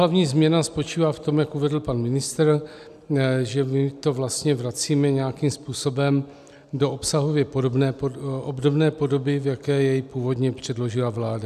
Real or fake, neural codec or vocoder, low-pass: real; none; 14.4 kHz